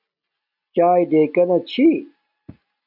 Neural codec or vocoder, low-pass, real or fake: none; 5.4 kHz; real